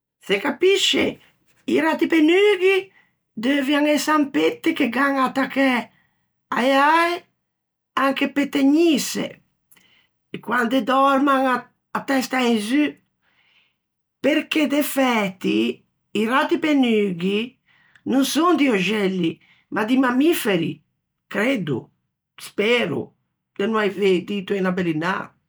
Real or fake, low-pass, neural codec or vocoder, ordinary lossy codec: real; none; none; none